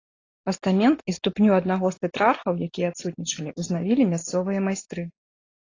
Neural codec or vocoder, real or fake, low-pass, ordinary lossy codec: none; real; 7.2 kHz; AAC, 32 kbps